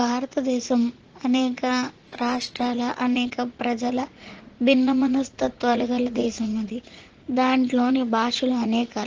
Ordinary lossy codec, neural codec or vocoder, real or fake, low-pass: Opus, 16 kbps; vocoder, 44.1 kHz, 80 mel bands, Vocos; fake; 7.2 kHz